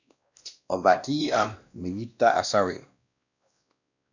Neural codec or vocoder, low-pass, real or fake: codec, 16 kHz, 1 kbps, X-Codec, WavLM features, trained on Multilingual LibriSpeech; 7.2 kHz; fake